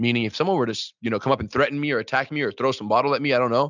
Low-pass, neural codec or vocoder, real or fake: 7.2 kHz; none; real